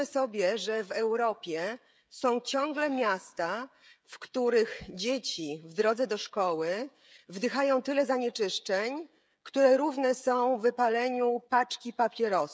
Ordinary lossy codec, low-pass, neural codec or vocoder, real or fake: none; none; codec, 16 kHz, 16 kbps, FreqCodec, smaller model; fake